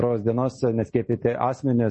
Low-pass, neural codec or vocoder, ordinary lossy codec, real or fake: 10.8 kHz; none; MP3, 32 kbps; real